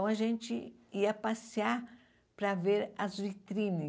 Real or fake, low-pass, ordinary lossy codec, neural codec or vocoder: real; none; none; none